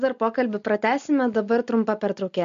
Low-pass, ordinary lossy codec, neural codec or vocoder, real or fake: 7.2 kHz; MP3, 48 kbps; none; real